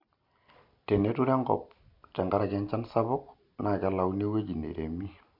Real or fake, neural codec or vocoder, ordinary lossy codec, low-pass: real; none; MP3, 48 kbps; 5.4 kHz